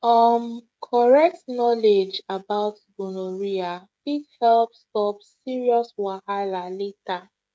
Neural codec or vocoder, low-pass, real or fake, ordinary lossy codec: codec, 16 kHz, 16 kbps, FreqCodec, smaller model; none; fake; none